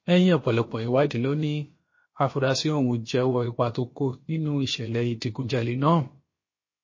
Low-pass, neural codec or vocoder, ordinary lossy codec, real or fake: 7.2 kHz; codec, 16 kHz, about 1 kbps, DyCAST, with the encoder's durations; MP3, 32 kbps; fake